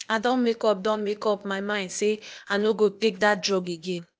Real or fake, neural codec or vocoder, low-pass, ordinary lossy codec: fake; codec, 16 kHz, 0.8 kbps, ZipCodec; none; none